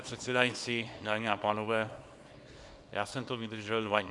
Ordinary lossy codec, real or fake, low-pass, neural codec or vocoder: Opus, 64 kbps; fake; 10.8 kHz; codec, 24 kHz, 0.9 kbps, WavTokenizer, small release